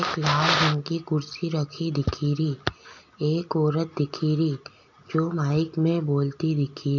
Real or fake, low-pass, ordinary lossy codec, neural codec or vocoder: real; 7.2 kHz; none; none